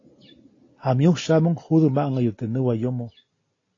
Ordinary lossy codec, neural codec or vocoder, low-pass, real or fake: AAC, 32 kbps; none; 7.2 kHz; real